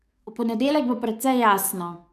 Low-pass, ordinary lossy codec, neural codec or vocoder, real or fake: 14.4 kHz; none; codec, 44.1 kHz, 7.8 kbps, DAC; fake